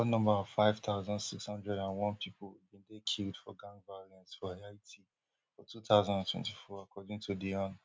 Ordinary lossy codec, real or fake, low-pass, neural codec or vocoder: none; real; none; none